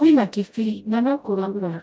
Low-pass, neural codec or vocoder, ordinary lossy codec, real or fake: none; codec, 16 kHz, 0.5 kbps, FreqCodec, smaller model; none; fake